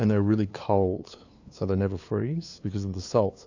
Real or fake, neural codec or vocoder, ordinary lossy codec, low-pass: fake; codec, 16 kHz, 2 kbps, FunCodec, trained on LibriTTS, 25 frames a second; AAC, 48 kbps; 7.2 kHz